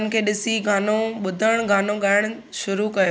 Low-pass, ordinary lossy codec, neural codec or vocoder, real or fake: none; none; none; real